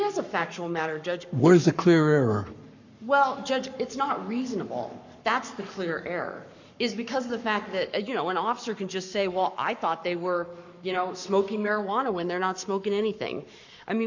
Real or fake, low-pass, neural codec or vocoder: fake; 7.2 kHz; codec, 44.1 kHz, 7.8 kbps, Pupu-Codec